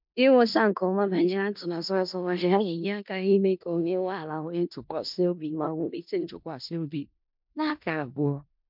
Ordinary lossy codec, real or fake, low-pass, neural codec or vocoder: MP3, 48 kbps; fake; 5.4 kHz; codec, 16 kHz in and 24 kHz out, 0.4 kbps, LongCat-Audio-Codec, four codebook decoder